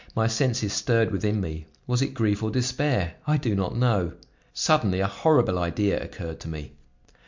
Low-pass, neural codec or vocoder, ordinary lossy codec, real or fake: 7.2 kHz; none; MP3, 64 kbps; real